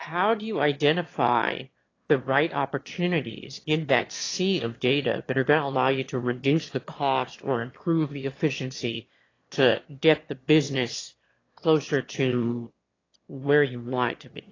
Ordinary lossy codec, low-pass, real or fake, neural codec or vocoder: AAC, 32 kbps; 7.2 kHz; fake; autoencoder, 22.05 kHz, a latent of 192 numbers a frame, VITS, trained on one speaker